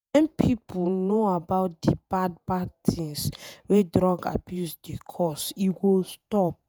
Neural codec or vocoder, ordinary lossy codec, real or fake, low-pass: vocoder, 48 kHz, 128 mel bands, Vocos; none; fake; none